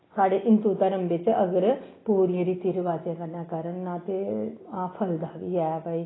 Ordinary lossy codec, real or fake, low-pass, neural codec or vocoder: AAC, 16 kbps; real; 7.2 kHz; none